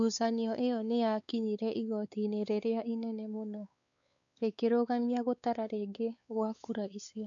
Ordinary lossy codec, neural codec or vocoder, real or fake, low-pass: none; codec, 16 kHz, 4 kbps, X-Codec, WavLM features, trained on Multilingual LibriSpeech; fake; 7.2 kHz